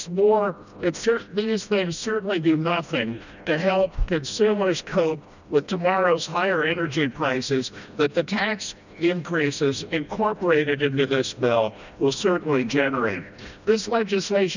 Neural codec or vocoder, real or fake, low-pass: codec, 16 kHz, 1 kbps, FreqCodec, smaller model; fake; 7.2 kHz